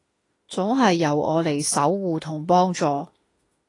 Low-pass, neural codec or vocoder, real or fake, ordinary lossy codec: 10.8 kHz; autoencoder, 48 kHz, 32 numbers a frame, DAC-VAE, trained on Japanese speech; fake; AAC, 32 kbps